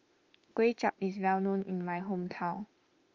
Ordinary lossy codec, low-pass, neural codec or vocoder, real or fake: Opus, 32 kbps; 7.2 kHz; autoencoder, 48 kHz, 32 numbers a frame, DAC-VAE, trained on Japanese speech; fake